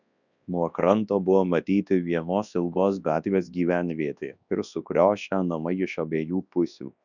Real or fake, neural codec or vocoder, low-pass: fake; codec, 24 kHz, 0.9 kbps, WavTokenizer, large speech release; 7.2 kHz